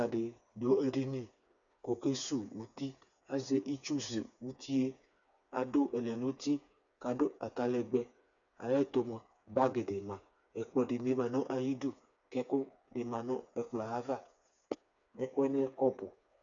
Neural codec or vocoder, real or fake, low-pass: codec, 16 kHz, 4 kbps, FreqCodec, smaller model; fake; 7.2 kHz